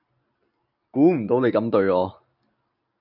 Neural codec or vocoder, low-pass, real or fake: none; 5.4 kHz; real